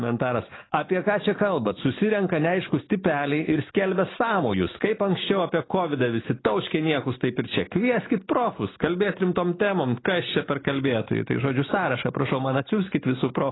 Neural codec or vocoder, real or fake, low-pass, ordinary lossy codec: none; real; 7.2 kHz; AAC, 16 kbps